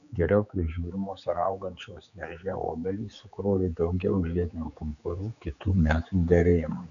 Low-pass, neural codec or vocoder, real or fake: 7.2 kHz; codec, 16 kHz, 4 kbps, X-Codec, HuBERT features, trained on general audio; fake